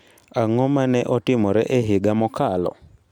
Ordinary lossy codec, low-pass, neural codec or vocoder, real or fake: none; 19.8 kHz; none; real